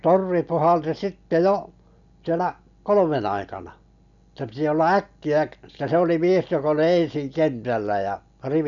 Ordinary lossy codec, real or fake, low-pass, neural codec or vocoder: none; real; 7.2 kHz; none